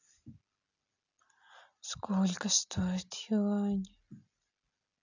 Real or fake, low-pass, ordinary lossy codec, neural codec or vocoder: real; 7.2 kHz; none; none